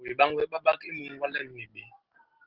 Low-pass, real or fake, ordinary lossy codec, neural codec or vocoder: 5.4 kHz; real; Opus, 16 kbps; none